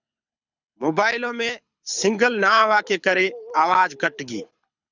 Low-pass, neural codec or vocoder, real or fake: 7.2 kHz; codec, 24 kHz, 6 kbps, HILCodec; fake